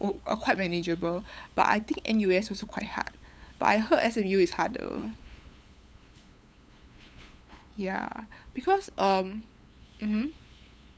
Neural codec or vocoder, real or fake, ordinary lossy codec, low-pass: codec, 16 kHz, 8 kbps, FunCodec, trained on LibriTTS, 25 frames a second; fake; none; none